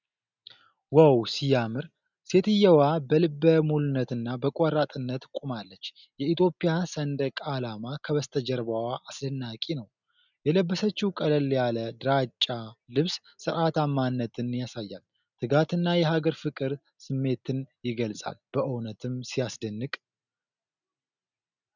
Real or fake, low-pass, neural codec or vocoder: real; 7.2 kHz; none